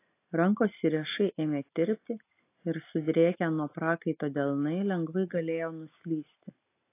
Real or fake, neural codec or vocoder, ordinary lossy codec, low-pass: real; none; AAC, 24 kbps; 3.6 kHz